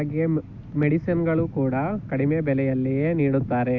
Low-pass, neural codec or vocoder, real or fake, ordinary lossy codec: 7.2 kHz; none; real; AAC, 48 kbps